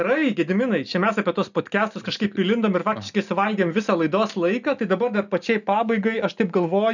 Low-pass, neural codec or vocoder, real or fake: 7.2 kHz; none; real